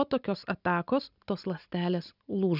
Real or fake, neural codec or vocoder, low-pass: real; none; 5.4 kHz